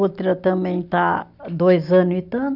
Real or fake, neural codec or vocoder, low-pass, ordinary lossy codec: real; none; 5.4 kHz; none